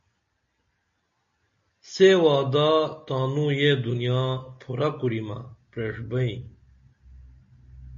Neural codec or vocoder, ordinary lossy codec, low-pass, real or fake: none; MP3, 32 kbps; 7.2 kHz; real